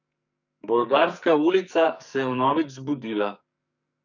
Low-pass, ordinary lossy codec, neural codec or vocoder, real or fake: 7.2 kHz; none; codec, 44.1 kHz, 2.6 kbps, SNAC; fake